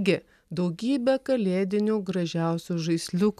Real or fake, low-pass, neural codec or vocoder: real; 14.4 kHz; none